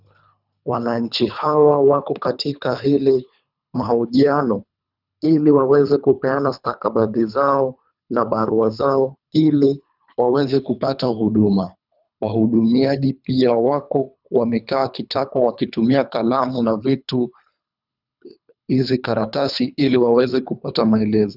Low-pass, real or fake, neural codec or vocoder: 5.4 kHz; fake; codec, 24 kHz, 3 kbps, HILCodec